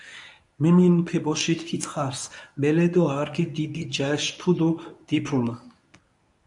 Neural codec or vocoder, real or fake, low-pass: codec, 24 kHz, 0.9 kbps, WavTokenizer, medium speech release version 1; fake; 10.8 kHz